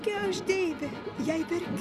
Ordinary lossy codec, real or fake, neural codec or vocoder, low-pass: Opus, 64 kbps; real; none; 14.4 kHz